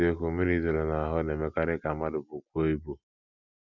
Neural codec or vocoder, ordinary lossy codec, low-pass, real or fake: none; none; 7.2 kHz; real